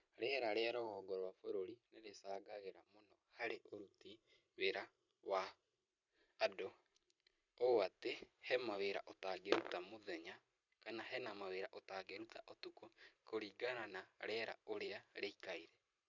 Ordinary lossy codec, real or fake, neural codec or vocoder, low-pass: none; fake; vocoder, 44.1 kHz, 128 mel bands every 512 samples, BigVGAN v2; 7.2 kHz